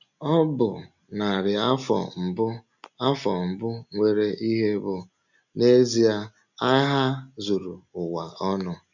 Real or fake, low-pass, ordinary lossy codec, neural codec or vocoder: real; 7.2 kHz; none; none